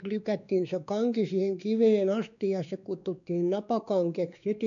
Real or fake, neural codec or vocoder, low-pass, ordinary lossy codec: fake; codec, 16 kHz, 2 kbps, X-Codec, WavLM features, trained on Multilingual LibriSpeech; 7.2 kHz; none